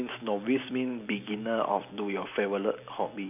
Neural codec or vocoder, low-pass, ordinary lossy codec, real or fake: none; 3.6 kHz; none; real